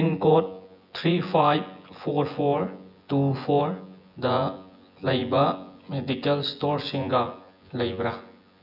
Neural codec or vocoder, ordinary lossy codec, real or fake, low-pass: vocoder, 24 kHz, 100 mel bands, Vocos; none; fake; 5.4 kHz